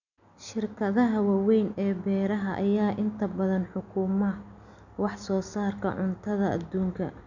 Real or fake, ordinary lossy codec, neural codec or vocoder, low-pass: real; none; none; 7.2 kHz